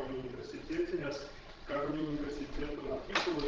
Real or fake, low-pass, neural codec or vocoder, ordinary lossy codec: fake; 7.2 kHz; codec, 16 kHz, 16 kbps, FreqCodec, larger model; Opus, 16 kbps